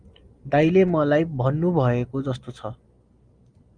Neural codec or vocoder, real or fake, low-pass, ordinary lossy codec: none; real; 9.9 kHz; Opus, 32 kbps